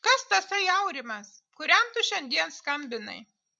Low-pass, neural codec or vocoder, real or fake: 9.9 kHz; vocoder, 44.1 kHz, 128 mel bands every 256 samples, BigVGAN v2; fake